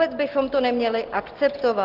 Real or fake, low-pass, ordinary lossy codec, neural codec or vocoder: real; 5.4 kHz; Opus, 16 kbps; none